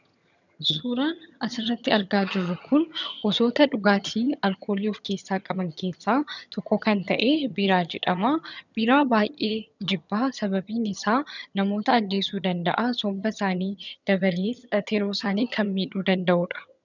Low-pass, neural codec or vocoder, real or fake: 7.2 kHz; vocoder, 22.05 kHz, 80 mel bands, HiFi-GAN; fake